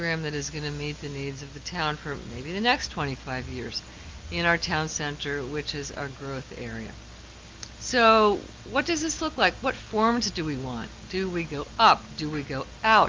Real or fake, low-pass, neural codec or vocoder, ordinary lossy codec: real; 7.2 kHz; none; Opus, 32 kbps